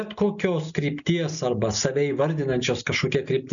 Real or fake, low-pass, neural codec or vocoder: real; 7.2 kHz; none